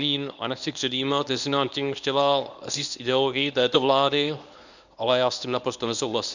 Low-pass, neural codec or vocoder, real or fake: 7.2 kHz; codec, 24 kHz, 0.9 kbps, WavTokenizer, medium speech release version 1; fake